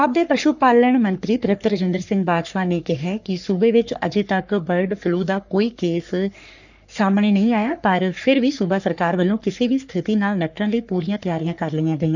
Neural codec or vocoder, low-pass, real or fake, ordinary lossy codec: codec, 44.1 kHz, 3.4 kbps, Pupu-Codec; 7.2 kHz; fake; none